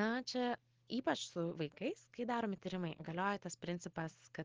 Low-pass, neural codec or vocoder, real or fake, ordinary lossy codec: 7.2 kHz; none; real; Opus, 16 kbps